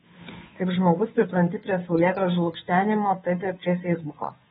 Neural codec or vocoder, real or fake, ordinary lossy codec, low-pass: codec, 16 kHz, 2 kbps, FunCodec, trained on Chinese and English, 25 frames a second; fake; AAC, 16 kbps; 7.2 kHz